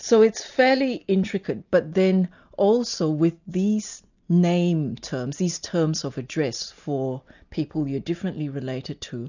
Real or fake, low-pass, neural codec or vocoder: real; 7.2 kHz; none